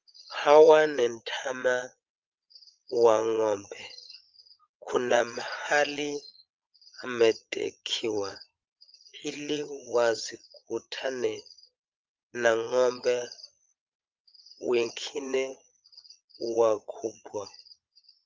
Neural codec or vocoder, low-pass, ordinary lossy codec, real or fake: vocoder, 22.05 kHz, 80 mel bands, Vocos; 7.2 kHz; Opus, 24 kbps; fake